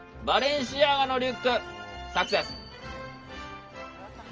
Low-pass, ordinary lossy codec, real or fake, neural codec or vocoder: 7.2 kHz; Opus, 24 kbps; real; none